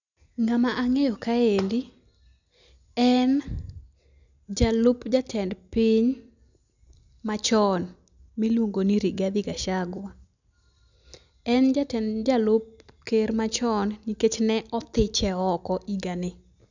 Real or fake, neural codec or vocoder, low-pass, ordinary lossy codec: real; none; 7.2 kHz; none